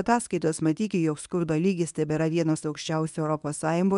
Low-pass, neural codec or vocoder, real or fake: 10.8 kHz; codec, 24 kHz, 0.9 kbps, WavTokenizer, medium speech release version 1; fake